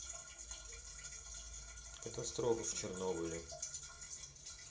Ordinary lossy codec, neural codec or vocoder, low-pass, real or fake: none; none; none; real